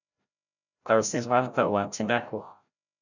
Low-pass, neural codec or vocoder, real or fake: 7.2 kHz; codec, 16 kHz, 0.5 kbps, FreqCodec, larger model; fake